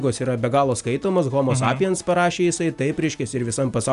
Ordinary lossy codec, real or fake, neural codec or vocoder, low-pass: AAC, 96 kbps; real; none; 10.8 kHz